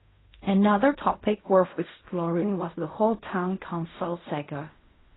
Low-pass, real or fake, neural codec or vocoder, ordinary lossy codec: 7.2 kHz; fake; codec, 16 kHz in and 24 kHz out, 0.4 kbps, LongCat-Audio-Codec, fine tuned four codebook decoder; AAC, 16 kbps